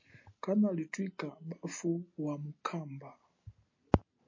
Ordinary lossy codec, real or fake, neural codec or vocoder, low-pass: MP3, 32 kbps; real; none; 7.2 kHz